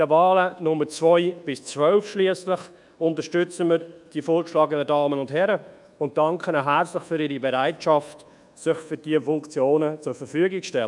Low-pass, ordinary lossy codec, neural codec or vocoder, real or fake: 10.8 kHz; MP3, 96 kbps; codec, 24 kHz, 1.2 kbps, DualCodec; fake